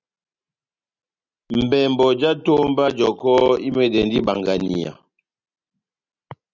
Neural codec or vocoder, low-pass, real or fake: none; 7.2 kHz; real